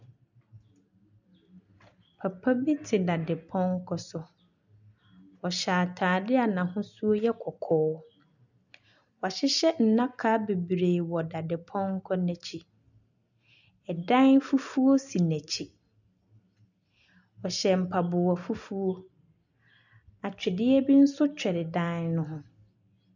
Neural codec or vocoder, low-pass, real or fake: none; 7.2 kHz; real